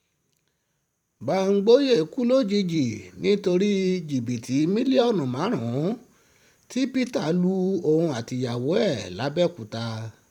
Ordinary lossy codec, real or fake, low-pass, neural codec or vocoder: none; fake; 19.8 kHz; vocoder, 44.1 kHz, 128 mel bands every 512 samples, BigVGAN v2